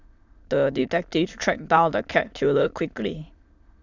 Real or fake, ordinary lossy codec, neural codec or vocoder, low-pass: fake; Opus, 64 kbps; autoencoder, 22.05 kHz, a latent of 192 numbers a frame, VITS, trained on many speakers; 7.2 kHz